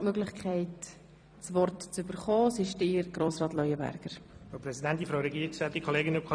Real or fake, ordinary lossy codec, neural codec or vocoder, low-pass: fake; none; vocoder, 44.1 kHz, 128 mel bands every 256 samples, BigVGAN v2; 9.9 kHz